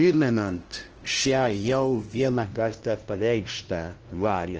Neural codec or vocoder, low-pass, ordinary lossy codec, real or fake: codec, 16 kHz, 0.5 kbps, FunCodec, trained on LibriTTS, 25 frames a second; 7.2 kHz; Opus, 16 kbps; fake